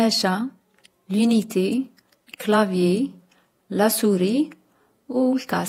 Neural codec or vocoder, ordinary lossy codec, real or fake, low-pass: vocoder, 48 kHz, 128 mel bands, Vocos; AAC, 48 kbps; fake; 19.8 kHz